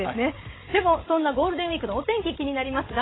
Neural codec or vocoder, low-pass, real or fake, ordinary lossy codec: codec, 16 kHz, 16 kbps, FreqCodec, larger model; 7.2 kHz; fake; AAC, 16 kbps